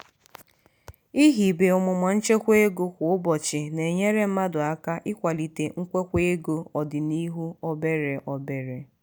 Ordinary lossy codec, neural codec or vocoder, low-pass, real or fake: none; none; none; real